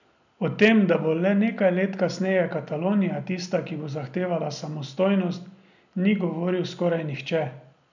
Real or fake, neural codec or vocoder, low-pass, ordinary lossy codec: real; none; 7.2 kHz; none